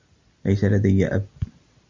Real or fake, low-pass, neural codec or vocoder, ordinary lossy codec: real; 7.2 kHz; none; MP3, 64 kbps